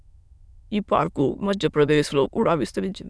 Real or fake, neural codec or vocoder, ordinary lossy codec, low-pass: fake; autoencoder, 22.05 kHz, a latent of 192 numbers a frame, VITS, trained on many speakers; none; none